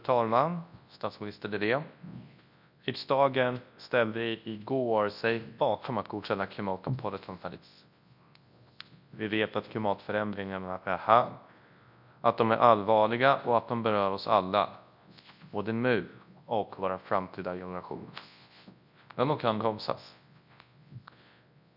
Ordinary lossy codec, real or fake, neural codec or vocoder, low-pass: none; fake; codec, 24 kHz, 0.9 kbps, WavTokenizer, large speech release; 5.4 kHz